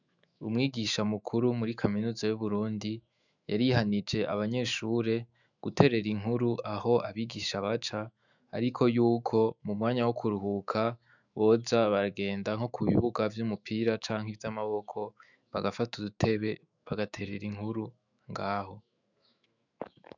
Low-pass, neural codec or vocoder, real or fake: 7.2 kHz; autoencoder, 48 kHz, 128 numbers a frame, DAC-VAE, trained on Japanese speech; fake